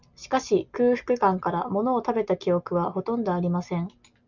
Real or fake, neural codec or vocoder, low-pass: real; none; 7.2 kHz